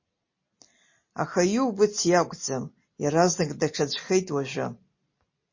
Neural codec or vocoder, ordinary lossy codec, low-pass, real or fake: none; MP3, 32 kbps; 7.2 kHz; real